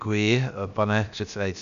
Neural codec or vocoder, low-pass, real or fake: codec, 16 kHz, about 1 kbps, DyCAST, with the encoder's durations; 7.2 kHz; fake